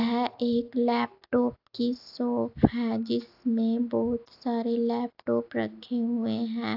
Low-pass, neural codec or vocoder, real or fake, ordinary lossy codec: 5.4 kHz; none; real; AAC, 48 kbps